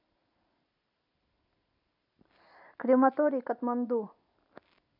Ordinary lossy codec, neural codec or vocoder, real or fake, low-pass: MP3, 48 kbps; vocoder, 44.1 kHz, 128 mel bands every 256 samples, BigVGAN v2; fake; 5.4 kHz